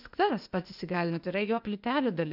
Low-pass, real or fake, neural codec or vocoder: 5.4 kHz; fake; codec, 16 kHz, 0.8 kbps, ZipCodec